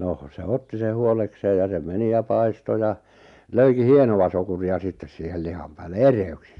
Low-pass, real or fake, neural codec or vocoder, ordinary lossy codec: 10.8 kHz; real; none; MP3, 96 kbps